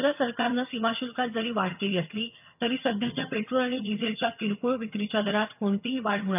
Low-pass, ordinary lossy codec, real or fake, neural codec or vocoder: 3.6 kHz; none; fake; vocoder, 22.05 kHz, 80 mel bands, HiFi-GAN